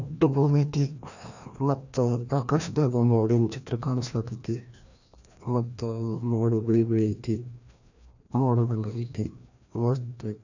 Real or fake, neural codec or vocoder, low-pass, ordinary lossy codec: fake; codec, 16 kHz, 1 kbps, FreqCodec, larger model; 7.2 kHz; none